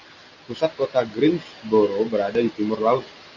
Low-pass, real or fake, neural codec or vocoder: 7.2 kHz; real; none